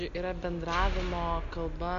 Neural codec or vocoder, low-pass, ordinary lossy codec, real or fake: none; 7.2 kHz; MP3, 96 kbps; real